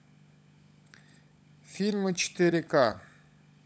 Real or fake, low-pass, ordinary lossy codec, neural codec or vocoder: fake; none; none; codec, 16 kHz, 16 kbps, FunCodec, trained on LibriTTS, 50 frames a second